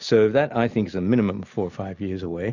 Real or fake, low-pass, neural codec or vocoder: real; 7.2 kHz; none